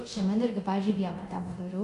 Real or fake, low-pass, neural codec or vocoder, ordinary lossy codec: fake; 10.8 kHz; codec, 24 kHz, 0.9 kbps, DualCodec; AAC, 64 kbps